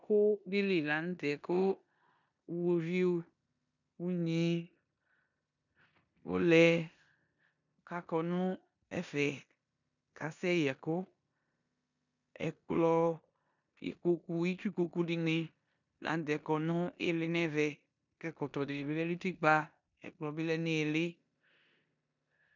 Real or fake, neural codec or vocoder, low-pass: fake; codec, 16 kHz in and 24 kHz out, 0.9 kbps, LongCat-Audio-Codec, four codebook decoder; 7.2 kHz